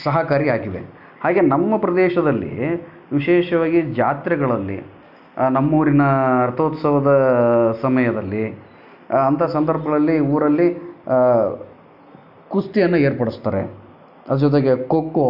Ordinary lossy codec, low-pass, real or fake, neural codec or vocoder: none; 5.4 kHz; real; none